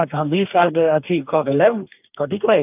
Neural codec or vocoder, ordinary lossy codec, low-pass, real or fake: codec, 44.1 kHz, 2.6 kbps, DAC; AAC, 32 kbps; 3.6 kHz; fake